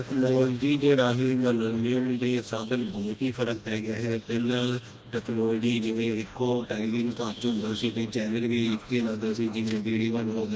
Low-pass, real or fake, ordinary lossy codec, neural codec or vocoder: none; fake; none; codec, 16 kHz, 1 kbps, FreqCodec, smaller model